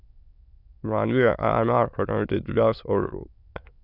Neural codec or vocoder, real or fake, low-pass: autoencoder, 22.05 kHz, a latent of 192 numbers a frame, VITS, trained on many speakers; fake; 5.4 kHz